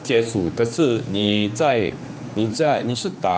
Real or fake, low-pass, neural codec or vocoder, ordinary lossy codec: fake; none; codec, 16 kHz, 2 kbps, X-Codec, HuBERT features, trained on general audio; none